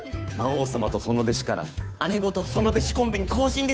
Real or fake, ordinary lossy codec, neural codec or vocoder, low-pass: fake; none; codec, 16 kHz, 2 kbps, FunCodec, trained on Chinese and English, 25 frames a second; none